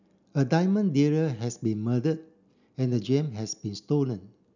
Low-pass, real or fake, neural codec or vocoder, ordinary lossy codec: 7.2 kHz; real; none; none